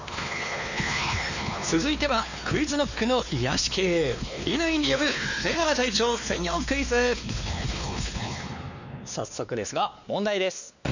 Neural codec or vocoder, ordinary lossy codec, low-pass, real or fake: codec, 16 kHz, 2 kbps, X-Codec, WavLM features, trained on Multilingual LibriSpeech; none; 7.2 kHz; fake